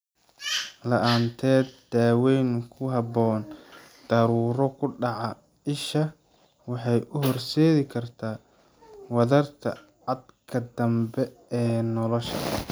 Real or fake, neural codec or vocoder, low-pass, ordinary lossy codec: real; none; none; none